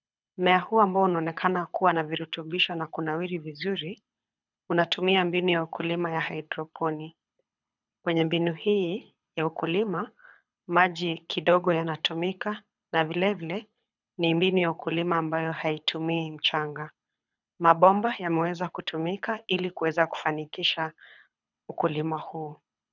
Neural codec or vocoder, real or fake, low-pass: codec, 24 kHz, 6 kbps, HILCodec; fake; 7.2 kHz